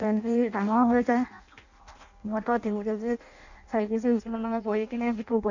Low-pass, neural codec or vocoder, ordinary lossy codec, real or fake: 7.2 kHz; codec, 16 kHz in and 24 kHz out, 0.6 kbps, FireRedTTS-2 codec; none; fake